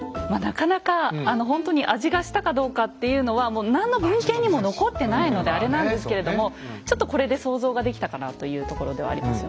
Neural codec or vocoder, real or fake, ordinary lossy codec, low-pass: none; real; none; none